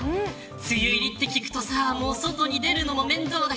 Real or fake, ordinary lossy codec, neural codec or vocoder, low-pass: real; none; none; none